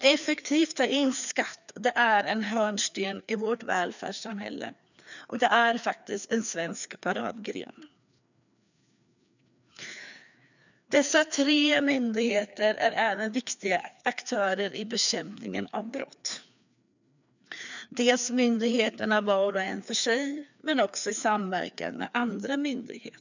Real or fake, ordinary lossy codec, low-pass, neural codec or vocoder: fake; none; 7.2 kHz; codec, 16 kHz, 2 kbps, FreqCodec, larger model